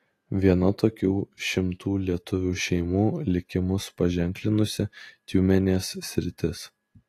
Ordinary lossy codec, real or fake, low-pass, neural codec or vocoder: AAC, 48 kbps; real; 14.4 kHz; none